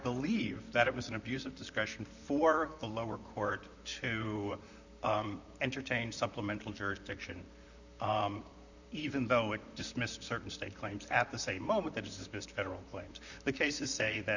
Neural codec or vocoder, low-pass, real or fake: vocoder, 44.1 kHz, 128 mel bands, Pupu-Vocoder; 7.2 kHz; fake